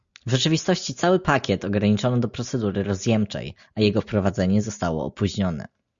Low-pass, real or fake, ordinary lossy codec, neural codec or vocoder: 7.2 kHz; real; Opus, 64 kbps; none